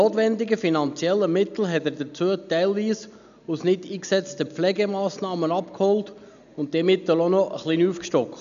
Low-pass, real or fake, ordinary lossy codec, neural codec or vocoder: 7.2 kHz; real; none; none